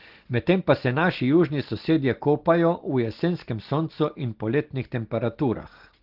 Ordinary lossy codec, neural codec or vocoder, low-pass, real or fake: Opus, 16 kbps; none; 5.4 kHz; real